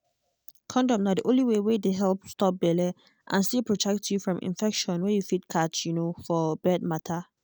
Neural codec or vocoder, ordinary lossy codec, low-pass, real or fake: none; none; none; real